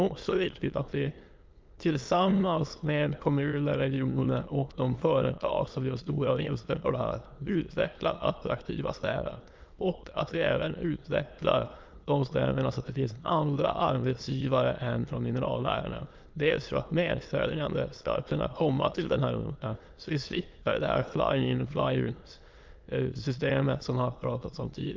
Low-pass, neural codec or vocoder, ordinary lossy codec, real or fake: 7.2 kHz; autoencoder, 22.05 kHz, a latent of 192 numbers a frame, VITS, trained on many speakers; Opus, 24 kbps; fake